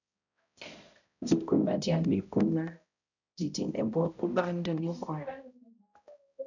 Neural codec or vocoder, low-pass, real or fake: codec, 16 kHz, 0.5 kbps, X-Codec, HuBERT features, trained on balanced general audio; 7.2 kHz; fake